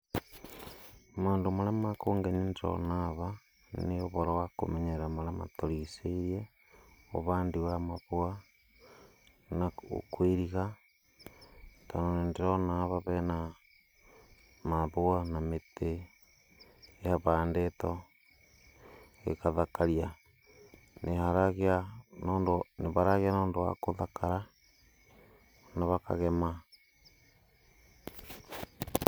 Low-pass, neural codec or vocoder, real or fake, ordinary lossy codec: none; none; real; none